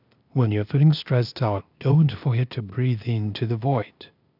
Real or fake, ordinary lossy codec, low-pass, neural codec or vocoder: fake; none; 5.4 kHz; codec, 16 kHz, 0.8 kbps, ZipCodec